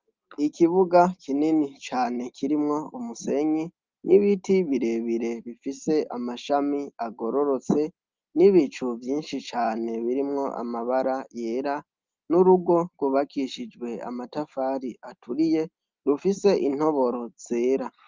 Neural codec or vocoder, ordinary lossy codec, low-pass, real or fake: none; Opus, 24 kbps; 7.2 kHz; real